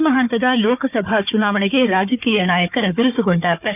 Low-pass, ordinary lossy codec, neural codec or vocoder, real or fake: 3.6 kHz; none; codec, 16 kHz, 4 kbps, FunCodec, trained on Chinese and English, 50 frames a second; fake